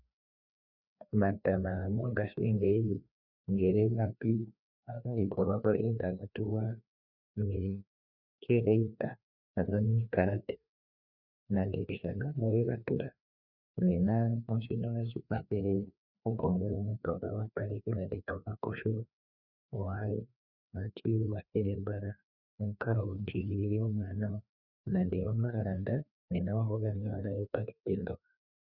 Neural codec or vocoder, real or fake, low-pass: codec, 16 kHz, 2 kbps, FreqCodec, larger model; fake; 5.4 kHz